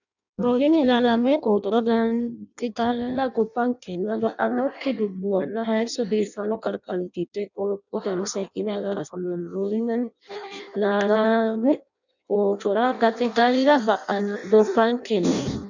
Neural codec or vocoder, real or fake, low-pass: codec, 16 kHz in and 24 kHz out, 0.6 kbps, FireRedTTS-2 codec; fake; 7.2 kHz